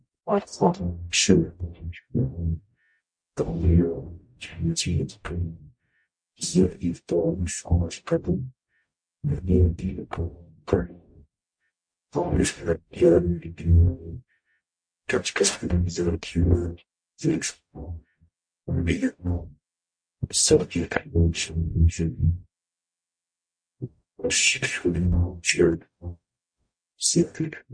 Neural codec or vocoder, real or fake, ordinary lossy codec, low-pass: codec, 44.1 kHz, 0.9 kbps, DAC; fake; MP3, 48 kbps; 9.9 kHz